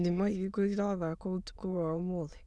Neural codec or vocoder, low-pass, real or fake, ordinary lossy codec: autoencoder, 22.05 kHz, a latent of 192 numbers a frame, VITS, trained on many speakers; none; fake; none